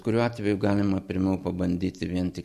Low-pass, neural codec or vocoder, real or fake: 14.4 kHz; vocoder, 44.1 kHz, 128 mel bands every 256 samples, BigVGAN v2; fake